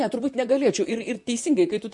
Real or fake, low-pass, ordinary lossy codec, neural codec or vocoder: fake; 10.8 kHz; MP3, 48 kbps; vocoder, 44.1 kHz, 128 mel bands, Pupu-Vocoder